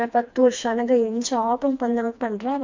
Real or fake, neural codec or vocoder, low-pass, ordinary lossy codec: fake; codec, 16 kHz, 2 kbps, FreqCodec, smaller model; 7.2 kHz; MP3, 64 kbps